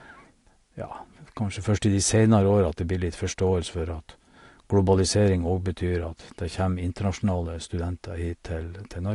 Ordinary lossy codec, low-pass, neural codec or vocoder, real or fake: AAC, 48 kbps; 10.8 kHz; none; real